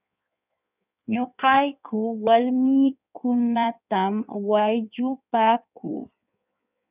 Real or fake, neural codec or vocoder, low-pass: fake; codec, 16 kHz in and 24 kHz out, 1.1 kbps, FireRedTTS-2 codec; 3.6 kHz